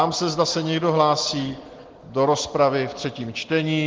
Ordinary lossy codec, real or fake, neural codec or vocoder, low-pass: Opus, 16 kbps; real; none; 7.2 kHz